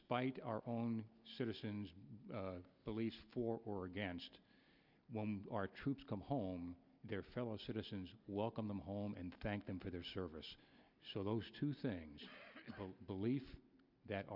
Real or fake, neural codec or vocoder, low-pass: real; none; 5.4 kHz